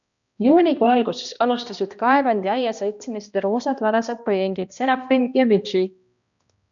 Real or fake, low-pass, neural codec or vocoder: fake; 7.2 kHz; codec, 16 kHz, 1 kbps, X-Codec, HuBERT features, trained on balanced general audio